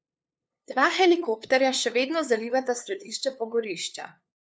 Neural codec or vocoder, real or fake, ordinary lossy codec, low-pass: codec, 16 kHz, 2 kbps, FunCodec, trained on LibriTTS, 25 frames a second; fake; none; none